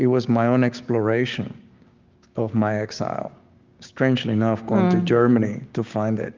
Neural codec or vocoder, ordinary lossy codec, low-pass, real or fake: codec, 16 kHz, 6 kbps, DAC; Opus, 24 kbps; 7.2 kHz; fake